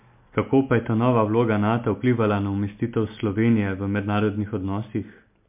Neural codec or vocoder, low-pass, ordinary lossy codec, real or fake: none; 3.6 kHz; MP3, 32 kbps; real